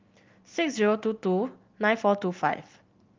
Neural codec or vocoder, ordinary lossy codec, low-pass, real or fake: none; Opus, 24 kbps; 7.2 kHz; real